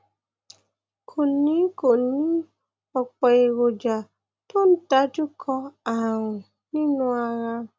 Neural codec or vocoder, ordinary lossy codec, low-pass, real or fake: none; none; none; real